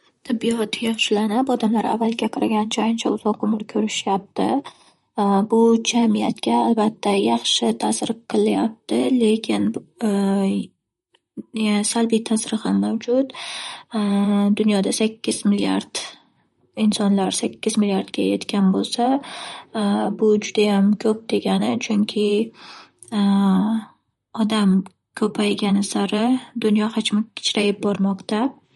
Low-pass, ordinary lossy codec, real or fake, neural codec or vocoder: 19.8 kHz; MP3, 48 kbps; fake; vocoder, 44.1 kHz, 128 mel bands, Pupu-Vocoder